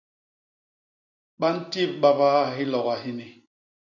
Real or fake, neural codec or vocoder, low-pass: real; none; 7.2 kHz